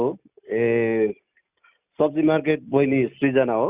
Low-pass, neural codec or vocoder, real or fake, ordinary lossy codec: 3.6 kHz; none; real; Opus, 64 kbps